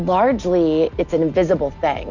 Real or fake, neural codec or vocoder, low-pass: real; none; 7.2 kHz